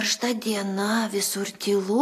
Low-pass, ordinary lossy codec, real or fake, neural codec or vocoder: 14.4 kHz; AAC, 64 kbps; real; none